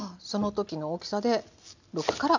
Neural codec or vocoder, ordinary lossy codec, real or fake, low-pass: none; none; real; 7.2 kHz